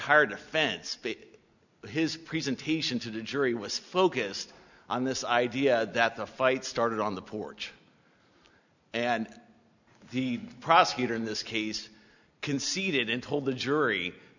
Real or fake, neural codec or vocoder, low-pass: real; none; 7.2 kHz